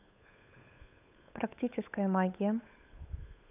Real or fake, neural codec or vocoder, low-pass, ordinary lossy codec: fake; codec, 16 kHz, 8 kbps, FunCodec, trained on Chinese and English, 25 frames a second; 3.6 kHz; none